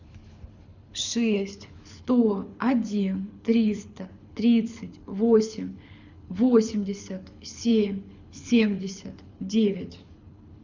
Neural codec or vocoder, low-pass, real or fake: codec, 24 kHz, 6 kbps, HILCodec; 7.2 kHz; fake